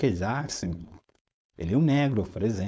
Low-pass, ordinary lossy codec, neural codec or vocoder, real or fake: none; none; codec, 16 kHz, 4.8 kbps, FACodec; fake